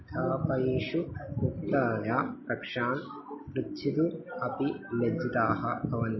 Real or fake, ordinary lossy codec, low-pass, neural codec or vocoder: real; MP3, 24 kbps; 7.2 kHz; none